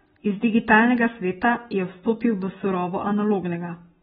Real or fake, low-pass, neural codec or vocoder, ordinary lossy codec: real; 10.8 kHz; none; AAC, 16 kbps